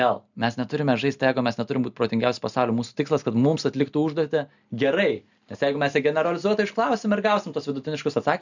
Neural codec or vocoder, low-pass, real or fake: none; 7.2 kHz; real